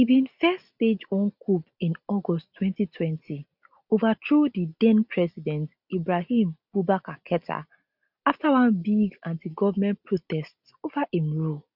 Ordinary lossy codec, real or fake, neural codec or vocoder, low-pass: none; real; none; 5.4 kHz